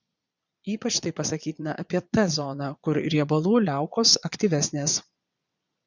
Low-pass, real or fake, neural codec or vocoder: 7.2 kHz; fake; vocoder, 22.05 kHz, 80 mel bands, Vocos